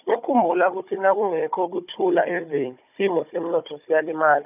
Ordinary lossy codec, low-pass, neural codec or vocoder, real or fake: none; 3.6 kHz; codec, 16 kHz, 16 kbps, FunCodec, trained on Chinese and English, 50 frames a second; fake